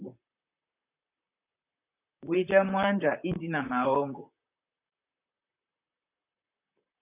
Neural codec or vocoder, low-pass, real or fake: vocoder, 44.1 kHz, 128 mel bands, Pupu-Vocoder; 3.6 kHz; fake